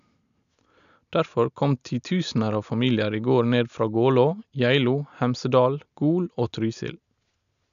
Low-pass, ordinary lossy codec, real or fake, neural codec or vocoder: 7.2 kHz; none; real; none